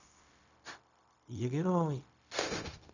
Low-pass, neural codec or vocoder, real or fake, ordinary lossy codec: 7.2 kHz; codec, 16 kHz, 0.4 kbps, LongCat-Audio-Codec; fake; none